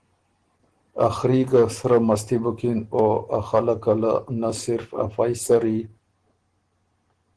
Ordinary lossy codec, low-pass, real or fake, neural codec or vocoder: Opus, 16 kbps; 9.9 kHz; real; none